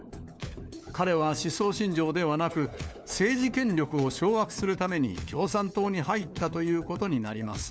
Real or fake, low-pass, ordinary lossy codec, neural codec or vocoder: fake; none; none; codec, 16 kHz, 4 kbps, FunCodec, trained on LibriTTS, 50 frames a second